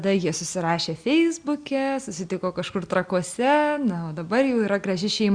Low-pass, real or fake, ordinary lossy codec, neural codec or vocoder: 9.9 kHz; real; Opus, 64 kbps; none